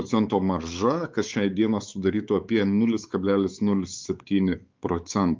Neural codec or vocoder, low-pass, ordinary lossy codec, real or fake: codec, 16 kHz, 4 kbps, X-Codec, WavLM features, trained on Multilingual LibriSpeech; 7.2 kHz; Opus, 24 kbps; fake